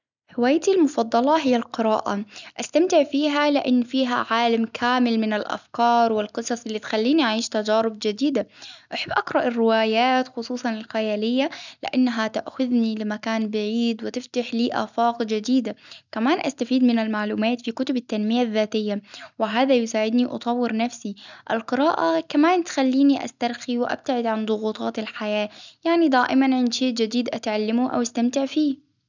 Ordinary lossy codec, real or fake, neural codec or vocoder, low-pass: none; real; none; 7.2 kHz